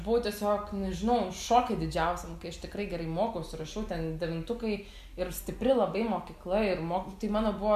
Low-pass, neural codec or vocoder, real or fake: 14.4 kHz; none; real